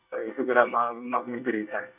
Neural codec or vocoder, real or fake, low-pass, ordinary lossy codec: codec, 24 kHz, 1 kbps, SNAC; fake; 3.6 kHz; none